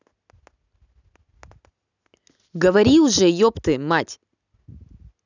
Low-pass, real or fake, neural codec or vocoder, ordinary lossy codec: 7.2 kHz; real; none; none